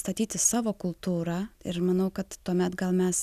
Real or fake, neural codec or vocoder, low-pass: real; none; 14.4 kHz